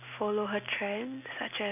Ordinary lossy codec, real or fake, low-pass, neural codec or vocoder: none; real; 3.6 kHz; none